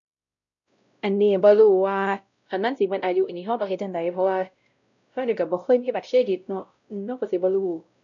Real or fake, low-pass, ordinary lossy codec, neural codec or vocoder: fake; 7.2 kHz; none; codec, 16 kHz, 0.5 kbps, X-Codec, WavLM features, trained on Multilingual LibriSpeech